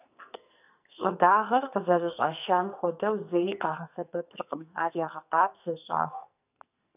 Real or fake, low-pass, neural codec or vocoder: fake; 3.6 kHz; codec, 44.1 kHz, 2.6 kbps, SNAC